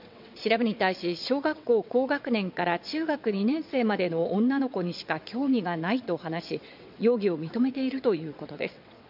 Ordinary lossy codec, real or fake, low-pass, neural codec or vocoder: MP3, 48 kbps; fake; 5.4 kHz; vocoder, 22.05 kHz, 80 mel bands, WaveNeXt